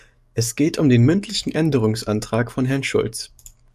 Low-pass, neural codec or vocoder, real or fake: 14.4 kHz; codec, 44.1 kHz, 7.8 kbps, DAC; fake